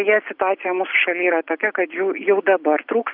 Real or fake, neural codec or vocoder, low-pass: real; none; 5.4 kHz